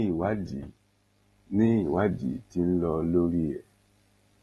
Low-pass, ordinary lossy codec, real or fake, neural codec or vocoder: 19.8 kHz; AAC, 32 kbps; real; none